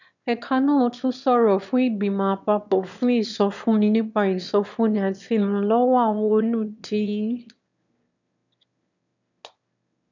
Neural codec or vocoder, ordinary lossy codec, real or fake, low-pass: autoencoder, 22.05 kHz, a latent of 192 numbers a frame, VITS, trained on one speaker; none; fake; 7.2 kHz